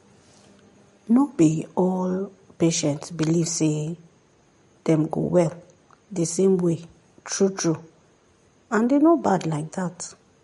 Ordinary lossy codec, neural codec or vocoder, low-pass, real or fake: MP3, 48 kbps; none; 19.8 kHz; real